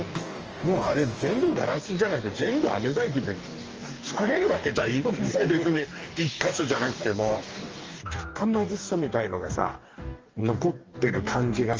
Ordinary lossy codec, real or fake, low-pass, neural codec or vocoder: Opus, 24 kbps; fake; 7.2 kHz; codec, 44.1 kHz, 2.6 kbps, DAC